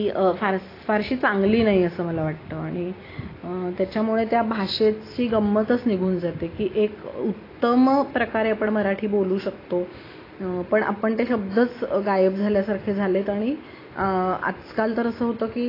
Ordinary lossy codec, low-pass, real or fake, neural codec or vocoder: AAC, 24 kbps; 5.4 kHz; real; none